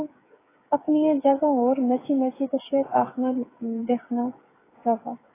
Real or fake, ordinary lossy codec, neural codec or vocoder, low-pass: fake; AAC, 16 kbps; codec, 16 kHz, 8 kbps, FreqCodec, smaller model; 3.6 kHz